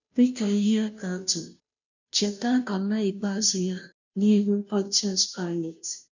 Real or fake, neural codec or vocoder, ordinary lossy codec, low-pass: fake; codec, 16 kHz, 0.5 kbps, FunCodec, trained on Chinese and English, 25 frames a second; none; 7.2 kHz